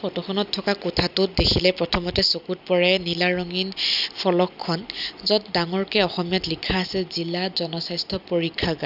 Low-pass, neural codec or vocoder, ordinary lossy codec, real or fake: 5.4 kHz; none; none; real